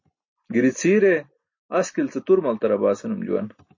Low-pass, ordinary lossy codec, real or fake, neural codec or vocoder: 7.2 kHz; MP3, 32 kbps; real; none